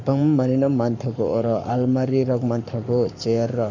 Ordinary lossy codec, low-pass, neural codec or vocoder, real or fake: none; 7.2 kHz; codec, 44.1 kHz, 7.8 kbps, Pupu-Codec; fake